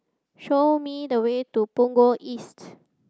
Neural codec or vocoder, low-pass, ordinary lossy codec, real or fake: none; none; none; real